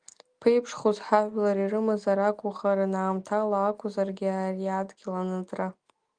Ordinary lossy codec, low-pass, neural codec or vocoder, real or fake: Opus, 32 kbps; 9.9 kHz; none; real